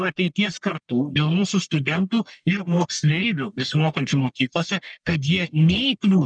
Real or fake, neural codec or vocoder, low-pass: fake; codec, 44.1 kHz, 1.7 kbps, Pupu-Codec; 9.9 kHz